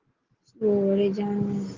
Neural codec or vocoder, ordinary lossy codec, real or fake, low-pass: none; Opus, 16 kbps; real; 7.2 kHz